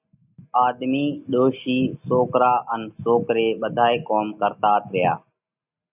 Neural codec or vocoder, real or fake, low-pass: none; real; 3.6 kHz